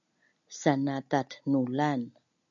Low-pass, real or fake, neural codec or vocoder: 7.2 kHz; real; none